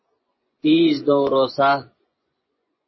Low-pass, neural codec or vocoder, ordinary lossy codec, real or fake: 7.2 kHz; vocoder, 24 kHz, 100 mel bands, Vocos; MP3, 24 kbps; fake